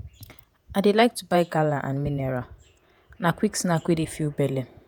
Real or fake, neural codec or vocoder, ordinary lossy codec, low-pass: fake; vocoder, 48 kHz, 128 mel bands, Vocos; none; none